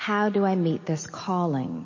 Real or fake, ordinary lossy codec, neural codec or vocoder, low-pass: real; MP3, 32 kbps; none; 7.2 kHz